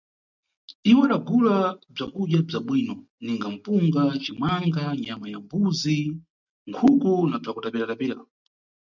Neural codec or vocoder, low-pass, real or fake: none; 7.2 kHz; real